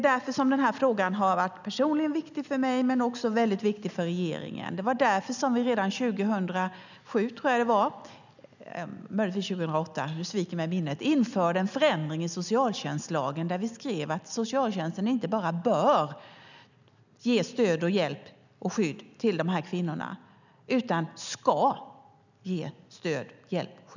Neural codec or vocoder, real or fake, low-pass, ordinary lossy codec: none; real; 7.2 kHz; none